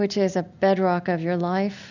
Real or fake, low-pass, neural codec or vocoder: real; 7.2 kHz; none